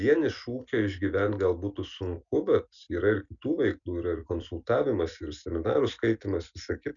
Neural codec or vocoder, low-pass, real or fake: none; 7.2 kHz; real